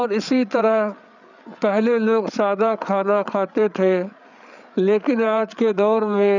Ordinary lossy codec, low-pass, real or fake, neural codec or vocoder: none; 7.2 kHz; fake; codec, 16 kHz, 8 kbps, FreqCodec, larger model